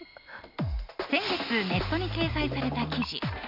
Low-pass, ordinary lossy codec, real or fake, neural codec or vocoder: 5.4 kHz; none; real; none